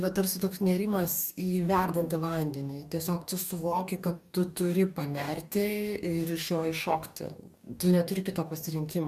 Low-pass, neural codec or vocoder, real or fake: 14.4 kHz; codec, 44.1 kHz, 2.6 kbps, DAC; fake